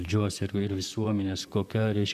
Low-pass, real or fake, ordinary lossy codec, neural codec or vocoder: 14.4 kHz; fake; MP3, 96 kbps; vocoder, 44.1 kHz, 128 mel bands, Pupu-Vocoder